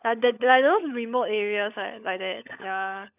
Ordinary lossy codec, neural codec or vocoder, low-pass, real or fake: none; codec, 16 kHz, 16 kbps, FunCodec, trained on LibriTTS, 50 frames a second; 3.6 kHz; fake